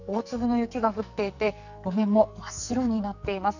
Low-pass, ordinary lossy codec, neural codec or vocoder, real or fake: 7.2 kHz; none; codec, 44.1 kHz, 2.6 kbps, SNAC; fake